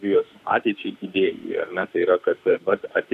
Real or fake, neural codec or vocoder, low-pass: fake; autoencoder, 48 kHz, 32 numbers a frame, DAC-VAE, trained on Japanese speech; 14.4 kHz